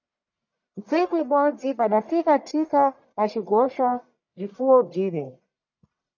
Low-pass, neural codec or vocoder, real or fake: 7.2 kHz; codec, 44.1 kHz, 1.7 kbps, Pupu-Codec; fake